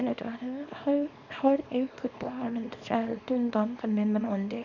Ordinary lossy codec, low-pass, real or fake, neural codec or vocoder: none; 7.2 kHz; fake; codec, 24 kHz, 0.9 kbps, WavTokenizer, small release